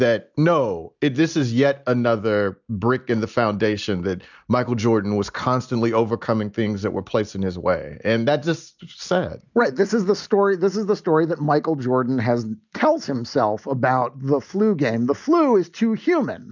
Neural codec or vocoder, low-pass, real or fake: none; 7.2 kHz; real